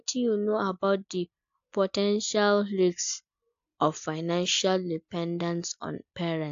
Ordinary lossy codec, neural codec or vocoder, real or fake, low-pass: AAC, 64 kbps; none; real; 7.2 kHz